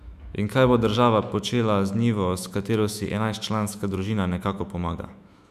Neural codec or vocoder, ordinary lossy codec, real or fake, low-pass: autoencoder, 48 kHz, 128 numbers a frame, DAC-VAE, trained on Japanese speech; none; fake; 14.4 kHz